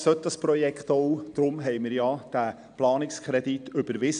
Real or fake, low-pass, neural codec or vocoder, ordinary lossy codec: real; 9.9 kHz; none; none